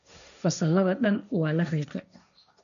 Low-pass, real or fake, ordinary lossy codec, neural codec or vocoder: 7.2 kHz; fake; none; codec, 16 kHz, 1.1 kbps, Voila-Tokenizer